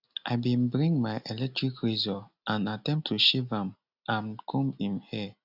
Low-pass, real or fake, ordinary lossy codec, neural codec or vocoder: 5.4 kHz; real; none; none